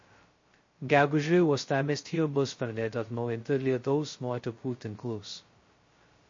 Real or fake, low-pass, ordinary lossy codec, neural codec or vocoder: fake; 7.2 kHz; MP3, 32 kbps; codec, 16 kHz, 0.2 kbps, FocalCodec